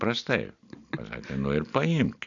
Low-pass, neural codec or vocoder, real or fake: 7.2 kHz; none; real